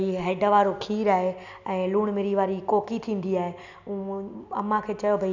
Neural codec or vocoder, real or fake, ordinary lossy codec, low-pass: none; real; none; 7.2 kHz